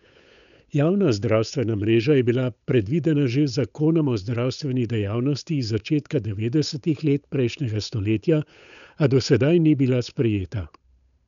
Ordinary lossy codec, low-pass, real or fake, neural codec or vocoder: none; 7.2 kHz; fake; codec, 16 kHz, 8 kbps, FunCodec, trained on Chinese and English, 25 frames a second